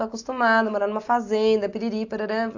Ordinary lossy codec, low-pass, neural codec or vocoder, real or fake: AAC, 48 kbps; 7.2 kHz; none; real